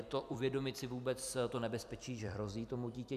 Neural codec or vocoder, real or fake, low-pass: vocoder, 44.1 kHz, 128 mel bands every 256 samples, BigVGAN v2; fake; 14.4 kHz